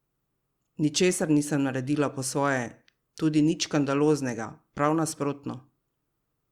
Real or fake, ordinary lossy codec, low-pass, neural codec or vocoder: real; Opus, 64 kbps; 19.8 kHz; none